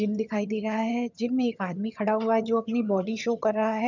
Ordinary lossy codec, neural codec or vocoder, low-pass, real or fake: none; vocoder, 22.05 kHz, 80 mel bands, HiFi-GAN; 7.2 kHz; fake